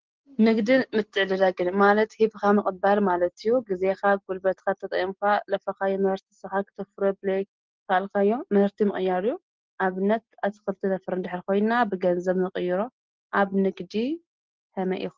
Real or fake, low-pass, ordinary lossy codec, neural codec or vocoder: real; 7.2 kHz; Opus, 16 kbps; none